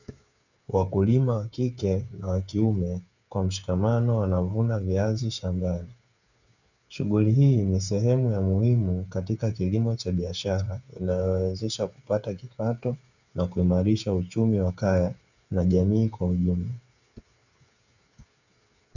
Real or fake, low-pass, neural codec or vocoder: fake; 7.2 kHz; codec, 16 kHz, 8 kbps, FreqCodec, smaller model